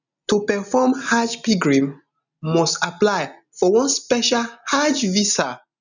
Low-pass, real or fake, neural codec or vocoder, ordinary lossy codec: 7.2 kHz; real; none; none